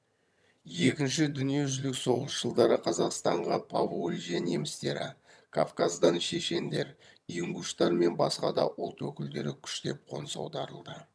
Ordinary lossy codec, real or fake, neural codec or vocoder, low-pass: none; fake; vocoder, 22.05 kHz, 80 mel bands, HiFi-GAN; none